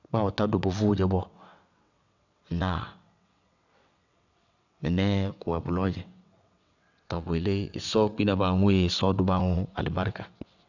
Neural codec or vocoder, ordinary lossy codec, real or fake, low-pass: vocoder, 22.05 kHz, 80 mel bands, WaveNeXt; none; fake; 7.2 kHz